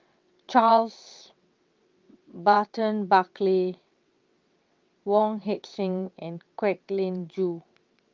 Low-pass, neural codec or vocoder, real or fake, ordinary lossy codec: 7.2 kHz; vocoder, 22.05 kHz, 80 mel bands, WaveNeXt; fake; Opus, 32 kbps